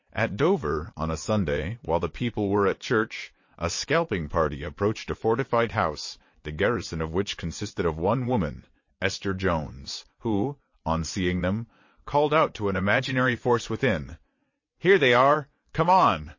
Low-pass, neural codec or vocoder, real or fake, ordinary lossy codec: 7.2 kHz; vocoder, 22.05 kHz, 80 mel bands, WaveNeXt; fake; MP3, 32 kbps